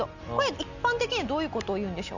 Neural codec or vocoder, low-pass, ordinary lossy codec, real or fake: none; 7.2 kHz; none; real